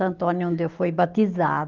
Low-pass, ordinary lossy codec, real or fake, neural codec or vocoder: 7.2 kHz; Opus, 32 kbps; real; none